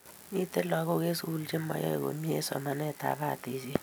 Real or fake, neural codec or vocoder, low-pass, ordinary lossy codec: real; none; none; none